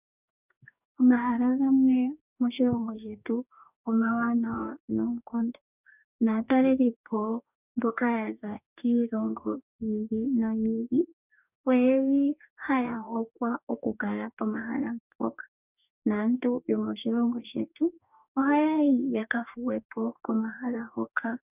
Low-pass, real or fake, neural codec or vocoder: 3.6 kHz; fake; codec, 44.1 kHz, 2.6 kbps, DAC